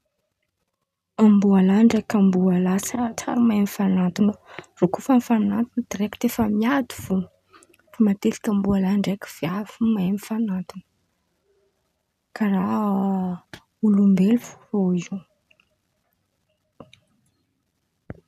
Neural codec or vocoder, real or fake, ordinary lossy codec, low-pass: none; real; none; 14.4 kHz